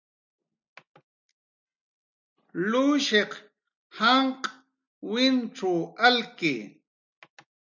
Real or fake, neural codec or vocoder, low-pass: real; none; 7.2 kHz